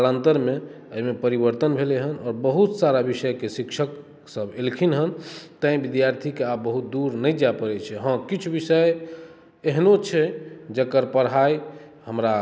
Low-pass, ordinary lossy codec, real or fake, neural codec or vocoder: none; none; real; none